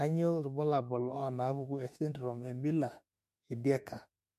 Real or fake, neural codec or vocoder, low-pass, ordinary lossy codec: fake; autoencoder, 48 kHz, 32 numbers a frame, DAC-VAE, trained on Japanese speech; 14.4 kHz; MP3, 64 kbps